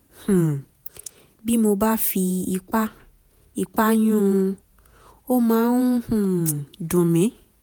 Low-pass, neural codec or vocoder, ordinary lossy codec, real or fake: none; vocoder, 48 kHz, 128 mel bands, Vocos; none; fake